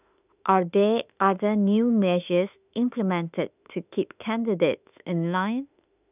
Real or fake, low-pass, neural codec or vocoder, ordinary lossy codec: fake; 3.6 kHz; autoencoder, 48 kHz, 32 numbers a frame, DAC-VAE, trained on Japanese speech; none